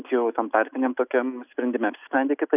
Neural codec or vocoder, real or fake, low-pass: none; real; 3.6 kHz